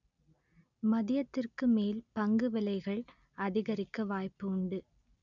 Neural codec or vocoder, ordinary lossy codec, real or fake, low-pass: none; none; real; 7.2 kHz